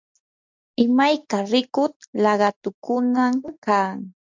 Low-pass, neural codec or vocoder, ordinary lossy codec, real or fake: 7.2 kHz; none; MP3, 64 kbps; real